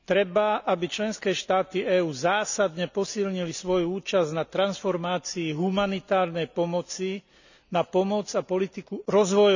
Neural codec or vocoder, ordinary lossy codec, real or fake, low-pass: none; none; real; 7.2 kHz